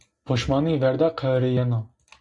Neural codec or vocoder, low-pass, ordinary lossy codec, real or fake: none; 10.8 kHz; MP3, 96 kbps; real